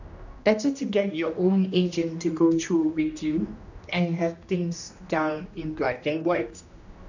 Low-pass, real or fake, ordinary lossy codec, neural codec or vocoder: 7.2 kHz; fake; none; codec, 16 kHz, 1 kbps, X-Codec, HuBERT features, trained on general audio